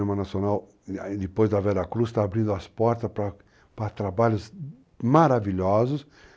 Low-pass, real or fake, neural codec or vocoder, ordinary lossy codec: none; real; none; none